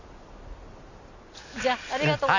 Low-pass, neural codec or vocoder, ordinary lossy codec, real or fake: 7.2 kHz; none; none; real